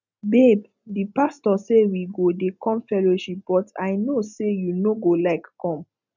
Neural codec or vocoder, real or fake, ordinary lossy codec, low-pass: none; real; none; 7.2 kHz